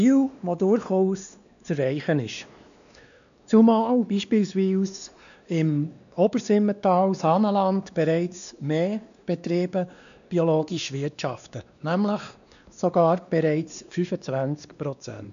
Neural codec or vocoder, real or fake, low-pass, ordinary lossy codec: codec, 16 kHz, 2 kbps, X-Codec, WavLM features, trained on Multilingual LibriSpeech; fake; 7.2 kHz; none